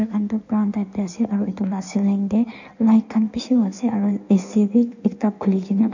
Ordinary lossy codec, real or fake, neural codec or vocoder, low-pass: none; fake; codec, 16 kHz in and 24 kHz out, 1.1 kbps, FireRedTTS-2 codec; 7.2 kHz